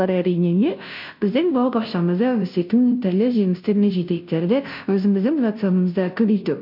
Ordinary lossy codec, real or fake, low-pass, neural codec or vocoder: MP3, 48 kbps; fake; 5.4 kHz; codec, 16 kHz, 0.5 kbps, FunCodec, trained on Chinese and English, 25 frames a second